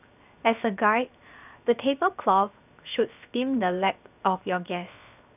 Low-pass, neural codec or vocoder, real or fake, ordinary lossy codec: 3.6 kHz; codec, 16 kHz, 0.7 kbps, FocalCodec; fake; none